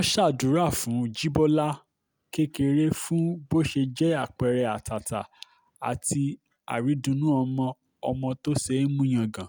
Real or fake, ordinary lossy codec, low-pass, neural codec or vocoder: real; none; none; none